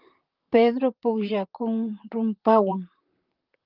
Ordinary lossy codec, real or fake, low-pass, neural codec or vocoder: Opus, 24 kbps; fake; 5.4 kHz; vocoder, 44.1 kHz, 128 mel bands, Pupu-Vocoder